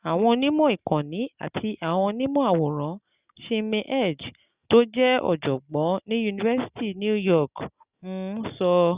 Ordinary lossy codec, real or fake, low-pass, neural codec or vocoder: Opus, 64 kbps; real; 3.6 kHz; none